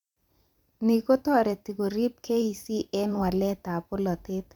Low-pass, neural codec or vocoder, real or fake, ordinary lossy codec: 19.8 kHz; vocoder, 44.1 kHz, 128 mel bands every 512 samples, BigVGAN v2; fake; none